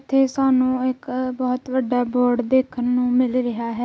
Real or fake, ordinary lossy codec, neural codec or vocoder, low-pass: real; none; none; none